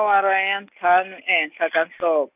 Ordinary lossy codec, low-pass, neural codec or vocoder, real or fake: none; 3.6 kHz; none; real